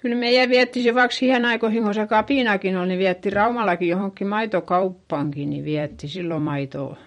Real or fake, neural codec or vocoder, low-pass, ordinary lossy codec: fake; vocoder, 44.1 kHz, 128 mel bands every 256 samples, BigVGAN v2; 19.8 kHz; MP3, 48 kbps